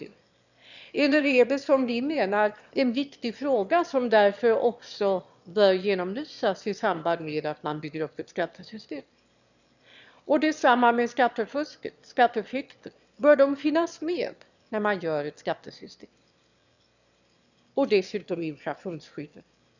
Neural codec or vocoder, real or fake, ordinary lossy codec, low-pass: autoencoder, 22.05 kHz, a latent of 192 numbers a frame, VITS, trained on one speaker; fake; none; 7.2 kHz